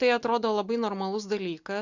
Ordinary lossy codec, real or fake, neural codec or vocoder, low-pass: Opus, 64 kbps; real; none; 7.2 kHz